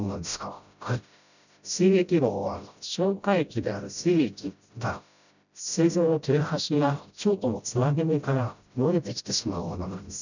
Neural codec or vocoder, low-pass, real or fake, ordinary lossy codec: codec, 16 kHz, 0.5 kbps, FreqCodec, smaller model; 7.2 kHz; fake; none